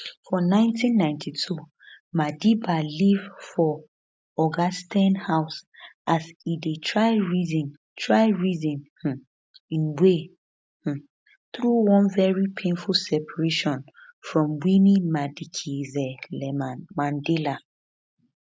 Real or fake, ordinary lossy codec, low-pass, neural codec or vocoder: real; none; none; none